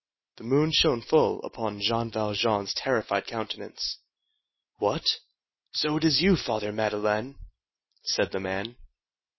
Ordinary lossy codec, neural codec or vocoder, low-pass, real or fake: MP3, 24 kbps; none; 7.2 kHz; real